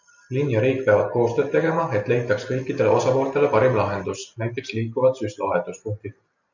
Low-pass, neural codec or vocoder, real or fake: 7.2 kHz; none; real